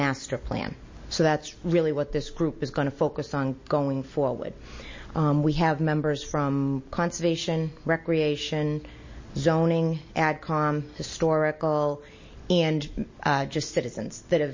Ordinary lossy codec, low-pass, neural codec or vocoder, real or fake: MP3, 32 kbps; 7.2 kHz; none; real